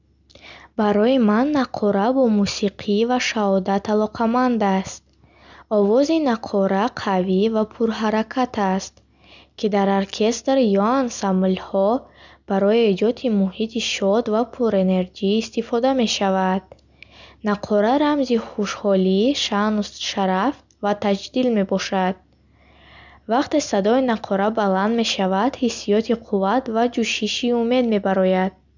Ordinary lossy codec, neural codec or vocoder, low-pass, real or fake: none; none; 7.2 kHz; real